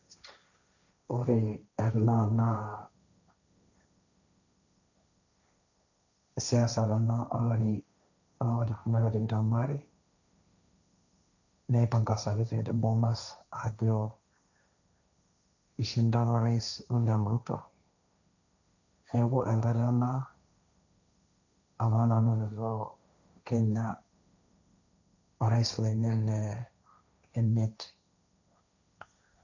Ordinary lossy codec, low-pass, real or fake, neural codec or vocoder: none; 7.2 kHz; fake; codec, 16 kHz, 1.1 kbps, Voila-Tokenizer